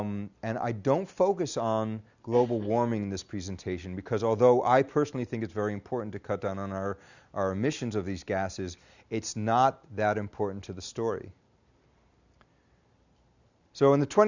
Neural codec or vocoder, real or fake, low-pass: none; real; 7.2 kHz